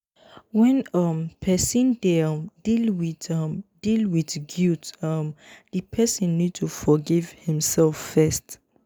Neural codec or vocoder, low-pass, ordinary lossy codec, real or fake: none; none; none; real